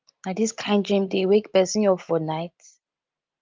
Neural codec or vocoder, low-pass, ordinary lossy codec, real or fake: none; 7.2 kHz; Opus, 32 kbps; real